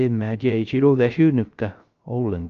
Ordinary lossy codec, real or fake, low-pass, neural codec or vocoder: Opus, 24 kbps; fake; 7.2 kHz; codec, 16 kHz, 0.3 kbps, FocalCodec